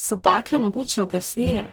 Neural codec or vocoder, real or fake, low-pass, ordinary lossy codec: codec, 44.1 kHz, 0.9 kbps, DAC; fake; none; none